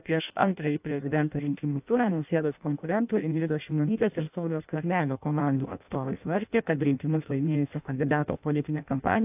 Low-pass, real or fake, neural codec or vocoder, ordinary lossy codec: 3.6 kHz; fake; codec, 16 kHz in and 24 kHz out, 0.6 kbps, FireRedTTS-2 codec; AAC, 32 kbps